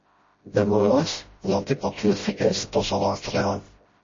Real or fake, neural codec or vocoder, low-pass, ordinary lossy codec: fake; codec, 16 kHz, 0.5 kbps, FreqCodec, smaller model; 7.2 kHz; MP3, 32 kbps